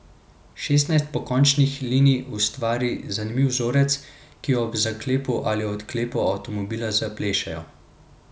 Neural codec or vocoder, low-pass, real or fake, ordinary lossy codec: none; none; real; none